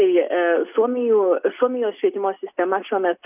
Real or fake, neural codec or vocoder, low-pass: real; none; 3.6 kHz